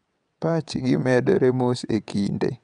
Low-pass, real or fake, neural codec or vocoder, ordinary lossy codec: 9.9 kHz; fake; vocoder, 22.05 kHz, 80 mel bands, WaveNeXt; none